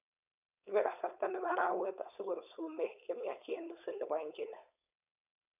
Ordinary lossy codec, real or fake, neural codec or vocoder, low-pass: none; fake; codec, 16 kHz, 4.8 kbps, FACodec; 3.6 kHz